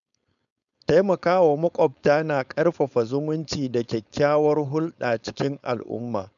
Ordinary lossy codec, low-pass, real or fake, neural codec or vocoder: none; 7.2 kHz; fake; codec, 16 kHz, 4.8 kbps, FACodec